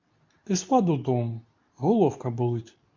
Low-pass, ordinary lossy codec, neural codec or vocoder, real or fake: 7.2 kHz; MP3, 64 kbps; codec, 24 kHz, 0.9 kbps, WavTokenizer, medium speech release version 2; fake